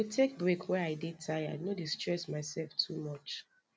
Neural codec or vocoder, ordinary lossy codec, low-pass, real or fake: none; none; none; real